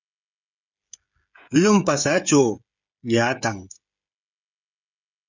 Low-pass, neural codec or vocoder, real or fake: 7.2 kHz; codec, 16 kHz, 16 kbps, FreqCodec, smaller model; fake